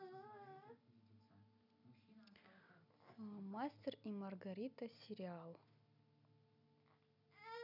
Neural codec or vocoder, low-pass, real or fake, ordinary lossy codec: none; 5.4 kHz; real; none